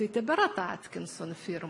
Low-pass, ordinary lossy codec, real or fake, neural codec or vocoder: 10.8 kHz; MP3, 96 kbps; real; none